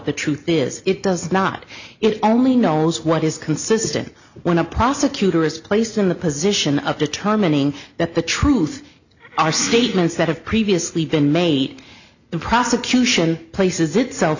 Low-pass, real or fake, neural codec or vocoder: 7.2 kHz; real; none